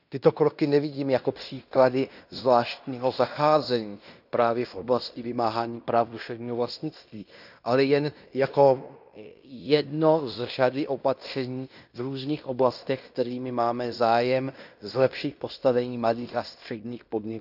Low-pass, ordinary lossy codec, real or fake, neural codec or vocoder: 5.4 kHz; none; fake; codec, 16 kHz in and 24 kHz out, 0.9 kbps, LongCat-Audio-Codec, fine tuned four codebook decoder